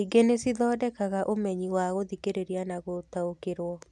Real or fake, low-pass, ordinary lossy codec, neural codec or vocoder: real; none; none; none